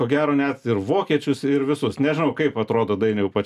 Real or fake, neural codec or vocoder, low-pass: real; none; 14.4 kHz